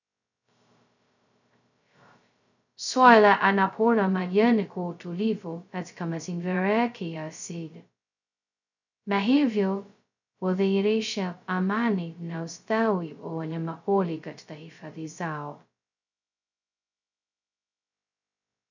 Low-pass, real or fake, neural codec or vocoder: 7.2 kHz; fake; codec, 16 kHz, 0.2 kbps, FocalCodec